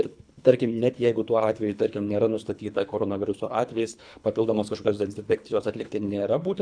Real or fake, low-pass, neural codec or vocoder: fake; 9.9 kHz; codec, 24 kHz, 3 kbps, HILCodec